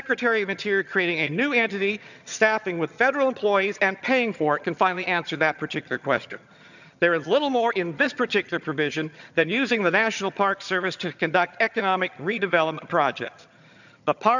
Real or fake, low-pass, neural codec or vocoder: fake; 7.2 kHz; vocoder, 22.05 kHz, 80 mel bands, HiFi-GAN